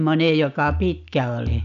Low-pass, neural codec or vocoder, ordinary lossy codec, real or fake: 7.2 kHz; none; none; real